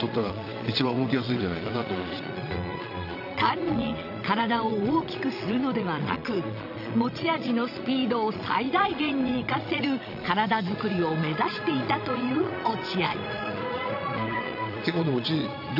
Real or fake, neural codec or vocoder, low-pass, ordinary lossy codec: fake; vocoder, 22.05 kHz, 80 mel bands, Vocos; 5.4 kHz; none